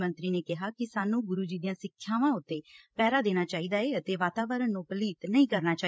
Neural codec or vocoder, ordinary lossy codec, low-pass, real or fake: codec, 16 kHz, 16 kbps, FreqCodec, larger model; none; none; fake